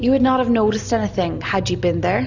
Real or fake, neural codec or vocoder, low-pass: real; none; 7.2 kHz